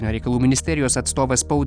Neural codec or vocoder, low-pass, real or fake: none; 9.9 kHz; real